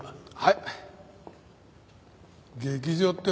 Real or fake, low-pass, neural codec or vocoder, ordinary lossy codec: real; none; none; none